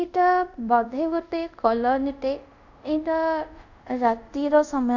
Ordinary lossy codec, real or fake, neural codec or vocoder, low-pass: none; fake; codec, 24 kHz, 0.5 kbps, DualCodec; 7.2 kHz